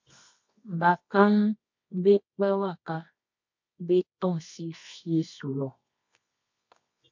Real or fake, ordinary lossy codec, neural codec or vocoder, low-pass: fake; MP3, 48 kbps; codec, 24 kHz, 0.9 kbps, WavTokenizer, medium music audio release; 7.2 kHz